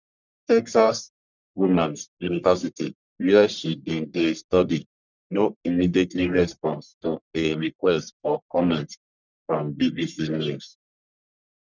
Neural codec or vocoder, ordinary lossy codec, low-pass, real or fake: codec, 44.1 kHz, 1.7 kbps, Pupu-Codec; none; 7.2 kHz; fake